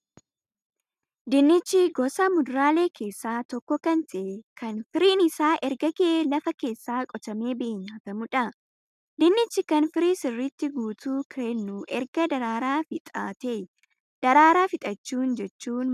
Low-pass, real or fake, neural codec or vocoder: 14.4 kHz; real; none